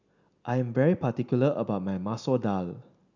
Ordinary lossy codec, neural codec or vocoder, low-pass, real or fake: none; none; 7.2 kHz; real